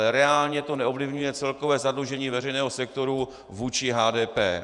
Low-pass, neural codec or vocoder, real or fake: 10.8 kHz; vocoder, 48 kHz, 128 mel bands, Vocos; fake